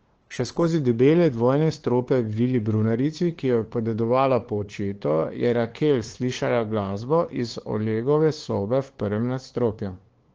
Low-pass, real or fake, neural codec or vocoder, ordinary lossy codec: 7.2 kHz; fake; codec, 16 kHz, 2 kbps, FunCodec, trained on LibriTTS, 25 frames a second; Opus, 16 kbps